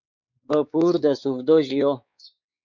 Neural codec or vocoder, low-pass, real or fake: codec, 16 kHz, 4 kbps, X-Codec, WavLM features, trained on Multilingual LibriSpeech; 7.2 kHz; fake